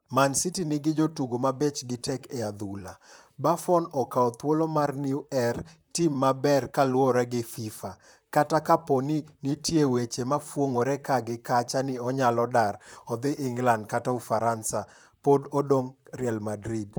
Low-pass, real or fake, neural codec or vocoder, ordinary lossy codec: none; fake; vocoder, 44.1 kHz, 128 mel bands, Pupu-Vocoder; none